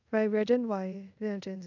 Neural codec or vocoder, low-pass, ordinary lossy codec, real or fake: codec, 24 kHz, 0.5 kbps, DualCodec; 7.2 kHz; none; fake